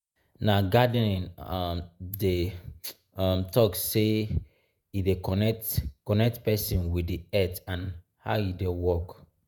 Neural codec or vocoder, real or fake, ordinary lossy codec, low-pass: vocoder, 48 kHz, 128 mel bands, Vocos; fake; none; none